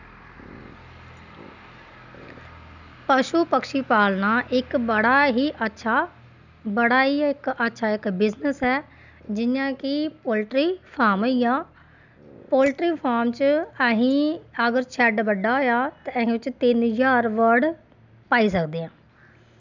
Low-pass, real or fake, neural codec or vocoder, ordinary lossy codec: 7.2 kHz; real; none; none